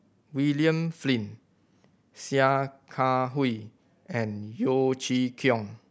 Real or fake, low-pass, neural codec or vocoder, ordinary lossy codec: real; none; none; none